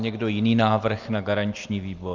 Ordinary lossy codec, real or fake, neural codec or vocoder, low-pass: Opus, 24 kbps; real; none; 7.2 kHz